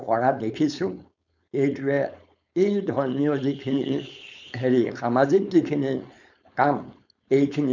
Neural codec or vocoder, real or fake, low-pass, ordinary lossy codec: codec, 16 kHz, 4.8 kbps, FACodec; fake; 7.2 kHz; none